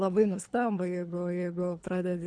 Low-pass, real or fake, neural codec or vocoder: 9.9 kHz; fake; codec, 24 kHz, 3 kbps, HILCodec